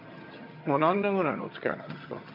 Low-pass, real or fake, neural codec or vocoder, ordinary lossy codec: 5.4 kHz; fake; vocoder, 22.05 kHz, 80 mel bands, HiFi-GAN; MP3, 48 kbps